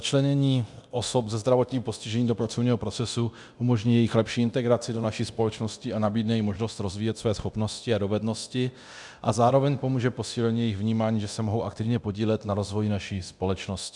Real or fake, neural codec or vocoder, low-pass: fake; codec, 24 kHz, 0.9 kbps, DualCodec; 10.8 kHz